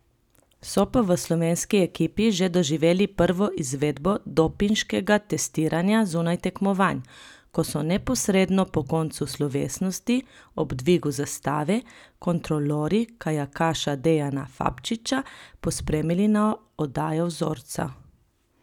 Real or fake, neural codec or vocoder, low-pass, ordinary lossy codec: real; none; 19.8 kHz; none